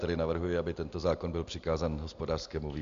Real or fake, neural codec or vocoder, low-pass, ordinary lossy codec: real; none; 7.2 kHz; MP3, 64 kbps